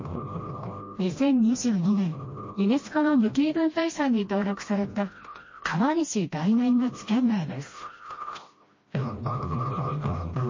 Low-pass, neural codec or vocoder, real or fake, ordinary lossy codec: 7.2 kHz; codec, 16 kHz, 1 kbps, FreqCodec, smaller model; fake; MP3, 32 kbps